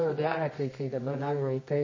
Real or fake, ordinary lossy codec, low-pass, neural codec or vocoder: fake; MP3, 32 kbps; 7.2 kHz; codec, 24 kHz, 0.9 kbps, WavTokenizer, medium music audio release